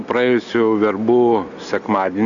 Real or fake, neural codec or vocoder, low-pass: real; none; 7.2 kHz